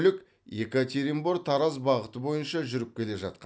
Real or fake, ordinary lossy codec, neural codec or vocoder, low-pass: real; none; none; none